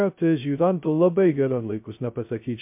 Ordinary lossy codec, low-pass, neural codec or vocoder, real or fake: MP3, 32 kbps; 3.6 kHz; codec, 16 kHz, 0.2 kbps, FocalCodec; fake